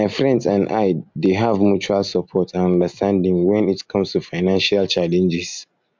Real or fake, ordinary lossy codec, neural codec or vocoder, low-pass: real; MP3, 64 kbps; none; 7.2 kHz